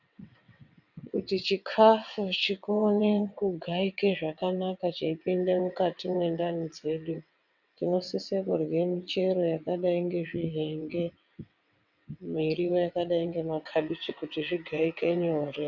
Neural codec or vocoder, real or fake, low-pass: vocoder, 22.05 kHz, 80 mel bands, WaveNeXt; fake; 7.2 kHz